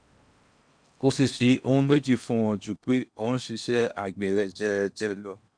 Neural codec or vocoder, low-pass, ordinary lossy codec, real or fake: codec, 16 kHz in and 24 kHz out, 0.6 kbps, FocalCodec, streaming, 4096 codes; 9.9 kHz; none; fake